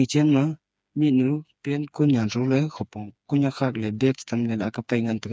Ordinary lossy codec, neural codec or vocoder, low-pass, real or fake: none; codec, 16 kHz, 4 kbps, FreqCodec, smaller model; none; fake